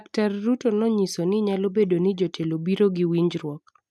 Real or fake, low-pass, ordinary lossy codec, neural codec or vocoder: real; none; none; none